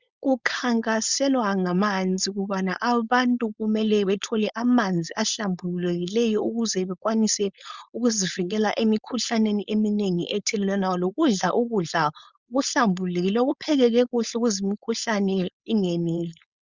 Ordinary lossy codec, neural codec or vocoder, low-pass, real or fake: Opus, 64 kbps; codec, 16 kHz, 4.8 kbps, FACodec; 7.2 kHz; fake